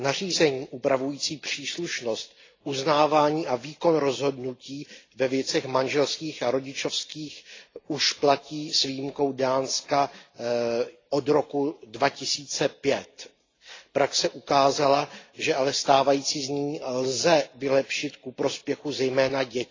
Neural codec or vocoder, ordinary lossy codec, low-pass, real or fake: none; AAC, 32 kbps; 7.2 kHz; real